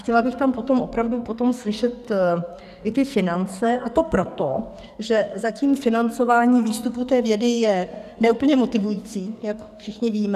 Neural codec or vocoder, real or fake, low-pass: codec, 44.1 kHz, 2.6 kbps, SNAC; fake; 14.4 kHz